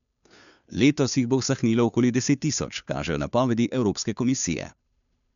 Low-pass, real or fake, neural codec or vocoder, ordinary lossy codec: 7.2 kHz; fake; codec, 16 kHz, 2 kbps, FunCodec, trained on Chinese and English, 25 frames a second; none